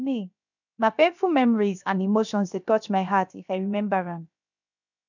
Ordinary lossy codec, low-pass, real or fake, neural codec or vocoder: none; 7.2 kHz; fake; codec, 16 kHz, 0.7 kbps, FocalCodec